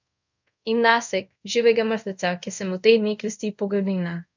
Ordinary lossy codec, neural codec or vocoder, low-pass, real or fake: none; codec, 24 kHz, 0.5 kbps, DualCodec; 7.2 kHz; fake